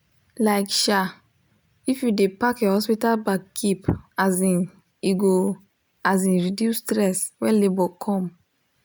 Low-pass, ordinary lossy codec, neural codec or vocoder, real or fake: none; none; none; real